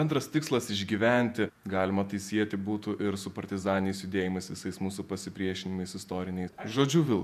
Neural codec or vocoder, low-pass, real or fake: vocoder, 48 kHz, 128 mel bands, Vocos; 14.4 kHz; fake